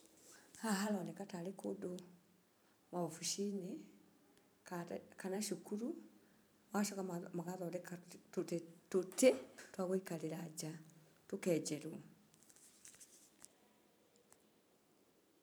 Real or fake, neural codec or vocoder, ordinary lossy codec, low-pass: fake; vocoder, 44.1 kHz, 128 mel bands every 512 samples, BigVGAN v2; none; none